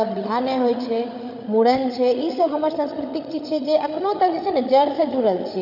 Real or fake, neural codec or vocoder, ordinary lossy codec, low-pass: fake; codec, 16 kHz, 8 kbps, FreqCodec, larger model; none; 5.4 kHz